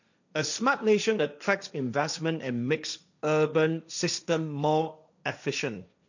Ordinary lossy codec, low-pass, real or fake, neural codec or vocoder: none; 7.2 kHz; fake; codec, 16 kHz, 1.1 kbps, Voila-Tokenizer